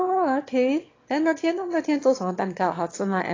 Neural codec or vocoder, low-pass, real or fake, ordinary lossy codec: autoencoder, 22.05 kHz, a latent of 192 numbers a frame, VITS, trained on one speaker; 7.2 kHz; fake; AAC, 32 kbps